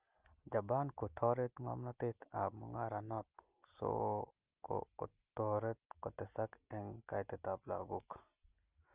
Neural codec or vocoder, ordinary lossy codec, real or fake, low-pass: none; Opus, 32 kbps; real; 3.6 kHz